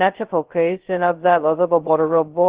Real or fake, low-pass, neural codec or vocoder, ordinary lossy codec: fake; 3.6 kHz; codec, 16 kHz, 0.2 kbps, FocalCodec; Opus, 16 kbps